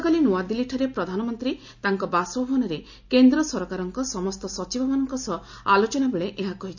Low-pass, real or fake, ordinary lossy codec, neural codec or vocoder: 7.2 kHz; real; none; none